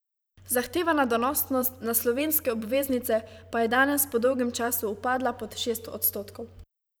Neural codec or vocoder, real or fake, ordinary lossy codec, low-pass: vocoder, 44.1 kHz, 128 mel bands every 512 samples, BigVGAN v2; fake; none; none